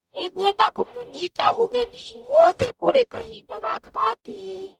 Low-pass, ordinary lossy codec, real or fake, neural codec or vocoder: 19.8 kHz; none; fake; codec, 44.1 kHz, 0.9 kbps, DAC